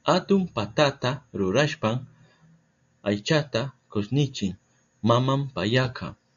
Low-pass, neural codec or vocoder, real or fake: 7.2 kHz; none; real